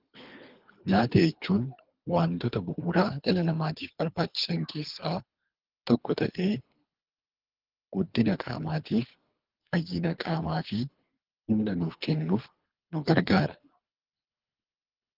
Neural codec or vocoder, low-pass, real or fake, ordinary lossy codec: codec, 24 kHz, 3 kbps, HILCodec; 5.4 kHz; fake; Opus, 32 kbps